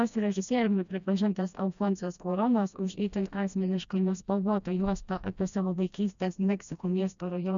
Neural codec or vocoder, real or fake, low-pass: codec, 16 kHz, 1 kbps, FreqCodec, smaller model; fake; 7.2 kHz